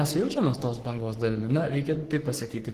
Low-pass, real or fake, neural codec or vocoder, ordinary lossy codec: 14.4 kHz; fake; codec, 44.1 kHz, 3.4 kbps, Pupu-Codec; Opus, 16 kbps